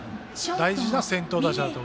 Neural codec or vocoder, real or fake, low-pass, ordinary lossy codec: none; real; none; none